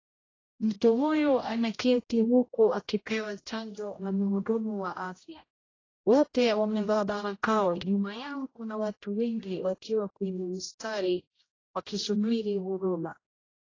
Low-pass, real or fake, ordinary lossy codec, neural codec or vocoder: 7.2 kHz; fake; AAC, 32 kbps; codec, 16 kHz, 0.5 kbps, X-Codec, HuBERT features, trained on general audio